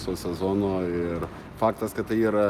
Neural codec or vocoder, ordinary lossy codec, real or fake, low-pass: none; Opus, 24 kbps; real; 14.4 kHz